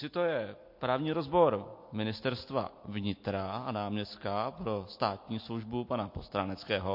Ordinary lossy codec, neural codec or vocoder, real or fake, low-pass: MP3, 32 kbps; none; real; 5.4 kHz